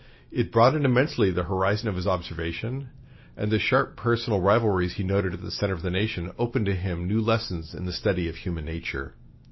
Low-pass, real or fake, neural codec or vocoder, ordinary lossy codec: 7.2 kHz; real; none; MP3, 24 kbps